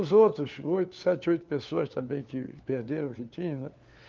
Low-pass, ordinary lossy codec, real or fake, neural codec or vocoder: 7.2 kHz; Opus, 32 kbps; fake; codec, 16 kHz, 4 kbps, FunCodec, trained on LibriTTS, 50 frames a second